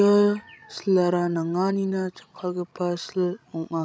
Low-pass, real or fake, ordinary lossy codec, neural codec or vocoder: none; fake; none; codec, 16 kHz, 16 kbps, FreqCodec, larger model